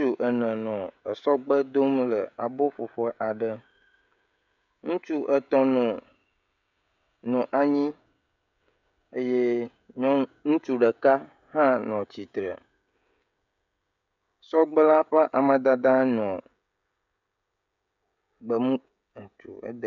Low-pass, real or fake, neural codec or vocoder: 7.2 kHz; fake; codec, 16 kHz, 16 kbps, FreqCodec, smaller model